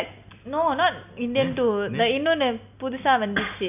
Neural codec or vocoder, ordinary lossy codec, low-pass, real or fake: none; none; 3.6 kHz; real